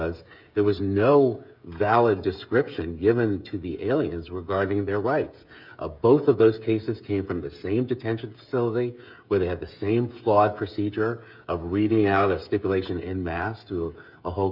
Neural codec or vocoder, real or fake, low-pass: codec, 16 kHz, 16 kbps, FreqCodec, smaller model; fake; 5.4 kHz